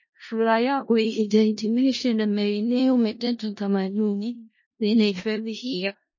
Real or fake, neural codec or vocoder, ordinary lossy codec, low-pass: fake; codec, 16 kHz in and 24 kHz out, 0.4 kbps, LongCat-Audio-Codec, four codebook decoder; MP3, 32 kbps; 7.2 kHz